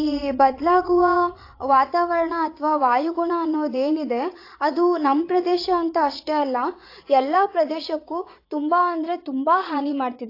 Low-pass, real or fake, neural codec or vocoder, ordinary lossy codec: 5.4 kHz; fake; vocoder, 22.05 kHz, 80 mel bands, Vocos; AAC, 32 kbps